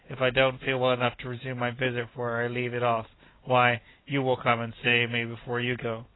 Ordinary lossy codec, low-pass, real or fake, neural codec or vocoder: AAC, 16 kbps; 7.2 kHz; real; none